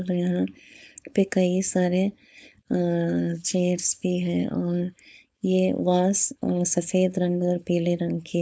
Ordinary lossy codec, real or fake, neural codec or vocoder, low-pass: none; fake; codec, 16 kHz, 4.8 kbps, FACodec; none